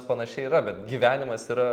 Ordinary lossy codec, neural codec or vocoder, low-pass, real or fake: Opus, 24 kbps; none; 19.8 kHz; real